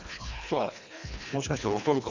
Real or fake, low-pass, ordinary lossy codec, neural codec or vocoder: fake; 7.2 kHz; MP3, 64 kbps; codec, 24 kHz, 1.5 kbps, HILCodec